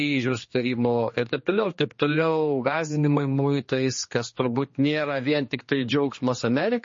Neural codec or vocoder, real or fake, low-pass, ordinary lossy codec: codec, 16 kHz, 2 kbps, X-Codec, HuBERT features, trained on general audio; fake; 7.2 kHz; MP3, 32 kbps